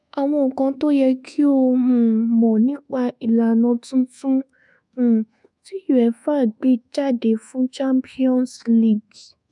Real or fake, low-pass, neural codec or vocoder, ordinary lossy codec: fake; 10.8 kHz; codec, 24 kHz, 1.2 kbps, DualCodec; none